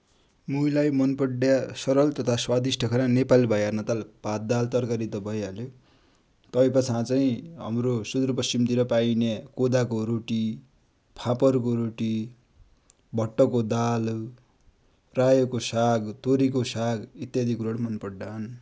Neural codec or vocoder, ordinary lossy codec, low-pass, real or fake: none; none; none; real